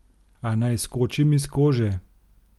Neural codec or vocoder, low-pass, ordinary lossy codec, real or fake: none; 19.8 kHz; Opus, 32 kbps; real